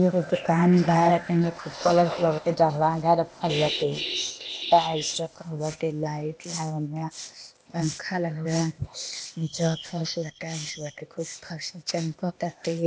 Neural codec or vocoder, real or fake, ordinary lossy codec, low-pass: codec, 16 kHz, 0.8 kbps, ZipCodec; fake; none; none